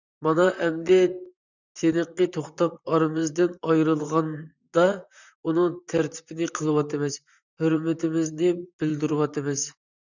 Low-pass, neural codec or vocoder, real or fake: 7.2 kHz; vocoder, 44.1 kHz, 128 mel bands, Pupu-Vocoder; fake